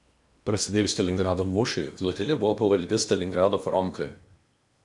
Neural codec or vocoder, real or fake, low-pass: codec, 16 kHz in and 24 kHz out, 0.8 kbps, FocalCodec, streaming, 65536 codes; fake; 10.8 kHz